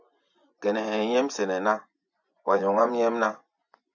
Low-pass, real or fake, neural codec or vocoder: 7.2 kHz; fake; vocoder, 24 kHz, 100 mel bands, Vocos